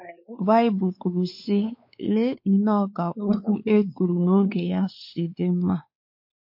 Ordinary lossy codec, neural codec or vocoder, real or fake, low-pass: MP3, 32 kbps; codec, 16 kHz, 4 kbps, X-Codec, WavLM features, trained on Multilingual LibriSpeech; fake; 5.4 kHz